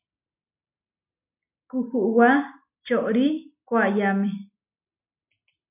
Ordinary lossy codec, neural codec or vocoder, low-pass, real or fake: MP3, 32 kbps; none; 3.6 kHz; real